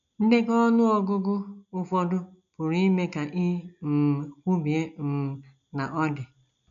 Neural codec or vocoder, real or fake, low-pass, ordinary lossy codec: none; real; 7.2 kHz; none